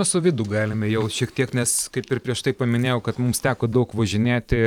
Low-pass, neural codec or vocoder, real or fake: 19.8 kHz; vocoder, 44.1 kHz, 128 mel bands every 512 samples, BigVGAN v2; fake